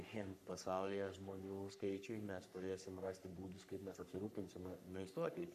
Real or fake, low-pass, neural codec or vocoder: fake; 14.4 kHz; codec, 44.1 kHz, 3.4 kbps, Pupu-Codec